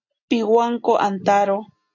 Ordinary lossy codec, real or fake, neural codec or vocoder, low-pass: AAC, 48 kbps; real; none; 7.2 kHz